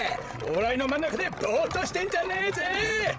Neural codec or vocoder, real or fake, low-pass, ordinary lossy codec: codec, 16 kHz, 16 kbps, FreqCodec, larger model; fake; none; none